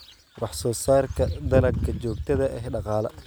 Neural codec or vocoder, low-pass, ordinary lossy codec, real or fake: none; none; none; real